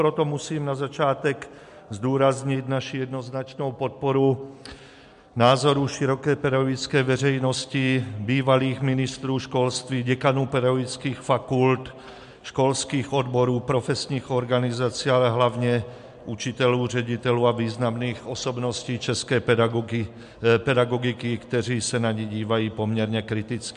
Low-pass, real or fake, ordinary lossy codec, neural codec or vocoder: 10.8 kHz; real; MP3, 64 kbps; none